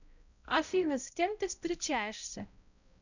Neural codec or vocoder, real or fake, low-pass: codec, 16 kHz, 0.5 kbps, X-Codec, HuBERT features, trained on balanced general audio; fake; 7.2 kHz